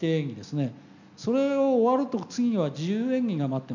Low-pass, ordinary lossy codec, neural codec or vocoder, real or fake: 7.2 kHz; none; none; real